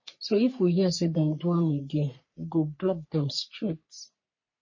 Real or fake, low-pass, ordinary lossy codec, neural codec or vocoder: fake; 7.2 kHz; MP3, 32 kbps; codec, 44.1 kHz, 3.4 kbps, Pupu-Codec